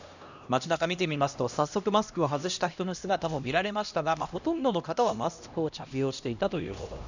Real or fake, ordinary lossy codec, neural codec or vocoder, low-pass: fake; none; codec, 16 kHz, 1 kbps, X-Codec, HuBERT features, trained on LibriSpeech; 7.2 kHz